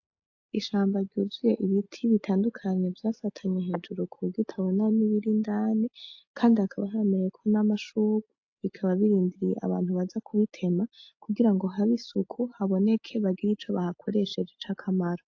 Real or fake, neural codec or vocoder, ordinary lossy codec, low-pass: real; none; AAC, 48 kbps; 7.2 kHz